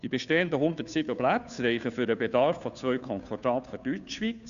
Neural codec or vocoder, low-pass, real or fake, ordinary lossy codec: codec, 16 kHz, 2 kbps, FunCodec, trained on Chinese and English, 25 frames a second; 7.2 kHz; fake; none